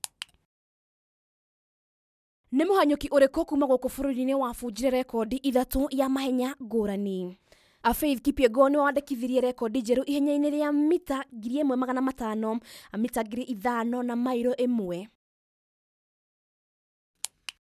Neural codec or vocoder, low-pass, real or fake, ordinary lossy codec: none; 14.4 kHz; real; none